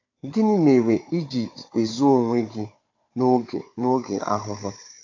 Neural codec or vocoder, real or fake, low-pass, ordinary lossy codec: codec, 16 kHz, 4 kbps, FunCodec, trained on Chinese and English, 50 frames a second; fake; 7.2 kHz; AAC, 48 kbps